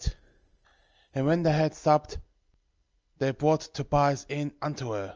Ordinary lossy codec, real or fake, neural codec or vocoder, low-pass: Opus, 24 kbps; real; none; 7.2 kHz